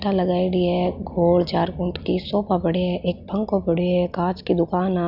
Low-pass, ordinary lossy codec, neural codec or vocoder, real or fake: 5.4 kHz; none; none; real